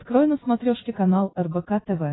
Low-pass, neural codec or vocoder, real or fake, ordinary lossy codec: 7.2 kHz; none; real; AAC, 16 kbps